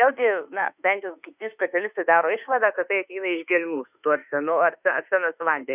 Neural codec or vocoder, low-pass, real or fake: autoencoder, 48 kHz, 32 numbers a frame, DAC-VAE, trained on Japanese speech; 3.6 kHz; fake